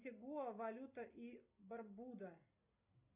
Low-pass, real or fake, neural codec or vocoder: 3.6 kHz; real; none